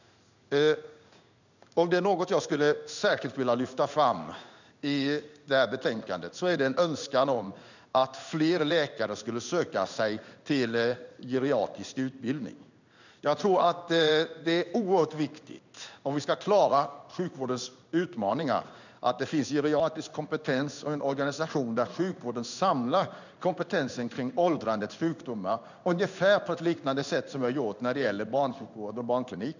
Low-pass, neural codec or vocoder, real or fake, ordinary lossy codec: 7.2 kHz; codec, 16 kHz in and 24 kHz out, 1 kbps, XY-Tokenizer; fake; none